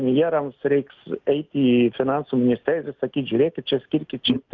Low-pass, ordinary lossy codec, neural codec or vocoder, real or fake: 7.2 kHz; Opus, 24 kbps; none; real